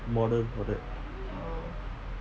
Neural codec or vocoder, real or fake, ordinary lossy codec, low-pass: none; real; none; none